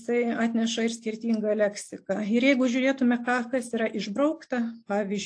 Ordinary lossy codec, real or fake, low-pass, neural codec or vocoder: AAC, 48 kbps; real; 9.9 kHz; none